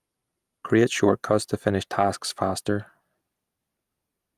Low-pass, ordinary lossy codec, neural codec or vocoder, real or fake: 14.4 kHz; Opus, 32 kbps; none; real